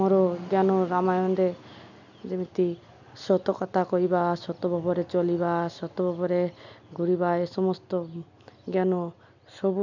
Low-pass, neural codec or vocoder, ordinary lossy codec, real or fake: 7.2 kHz; none; none; real